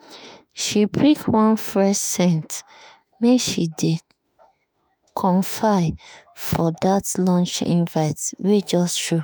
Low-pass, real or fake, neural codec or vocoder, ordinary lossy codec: none; fake; autoencoder, 48 kHz, 32 numbers a frame, DAC-VAE, trained on Japanese speech; none